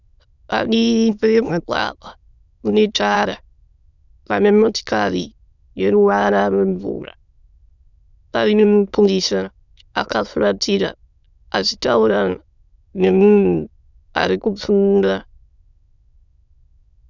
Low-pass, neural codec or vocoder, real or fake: 7.2 kHz; autoencoder, 22.05 kHz, a latent of 192 numbers a frame, VITS, trained on many speakers; fake